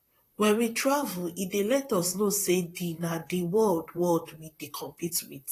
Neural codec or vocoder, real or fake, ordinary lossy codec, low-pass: vocoder, 44.1 kHz, 128 mel bands, Pupu-Vocoder; fake; AAC, 48 kbps; 14.4 kHz